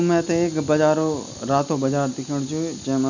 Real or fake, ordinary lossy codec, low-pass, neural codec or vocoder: real; none; 7.2 kHz; none